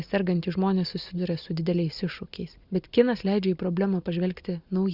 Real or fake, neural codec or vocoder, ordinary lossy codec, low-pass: real; none; Opus, 64 kbps; 5.4 kHz